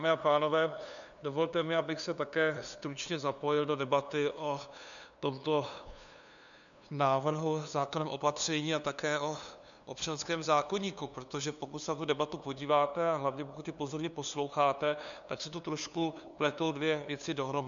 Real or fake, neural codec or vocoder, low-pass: fake; codec, 16 kHz, 2 kbps, FunCodec, trained on LibriTTS, 25 frames a second; 7.2 kHz